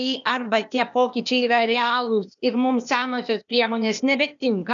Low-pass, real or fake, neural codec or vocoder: 7.2 kHz; fake; codec, 16 kHz, 0.8 kbps, ZipCodec